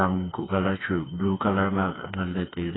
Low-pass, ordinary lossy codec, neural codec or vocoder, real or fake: 7.2 kHz; AAC, 16 kbps; codec, 16 kHz, 4 kbps, FreqCodec, smaller model; fake